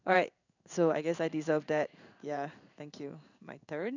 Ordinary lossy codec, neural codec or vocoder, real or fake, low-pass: none; codec, 16 kHz in and 24 kHz out, 1 kbps, XY-Tokenizer; fake; 7.2 kHz